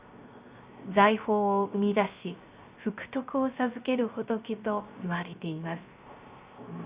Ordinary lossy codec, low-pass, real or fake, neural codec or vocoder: Opus, 64 kbps; 3.6 kHz; fake; codec, 16 kHz, 0.3 kbps, FocalCodec